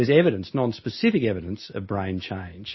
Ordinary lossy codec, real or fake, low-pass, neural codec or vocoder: MP3, 24 kbps; real; 7.2 kHz; none